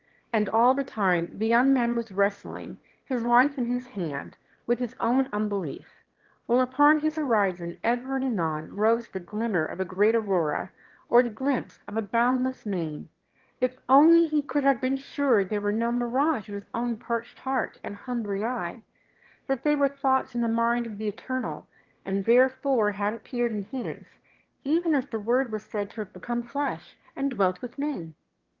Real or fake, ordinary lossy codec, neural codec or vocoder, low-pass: fake; Opus, 16 kbps; autoencoder, 22.05 kHz, a latent of 192 numbers a frame, VITS, trained on one speaker; 7.2 kHz